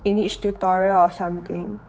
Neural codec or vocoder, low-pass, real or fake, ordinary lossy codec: codec, 16 kHz, 4 kbps, X-Codec, HuBERT features, trained on general audio; none; fake; none